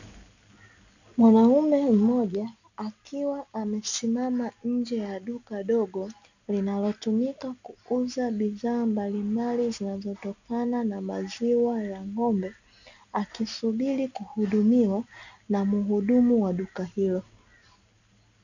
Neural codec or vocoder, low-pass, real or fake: none; 7.2 kHz; real